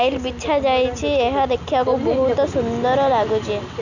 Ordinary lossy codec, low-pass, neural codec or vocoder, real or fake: none; 7.2 kHz; none; real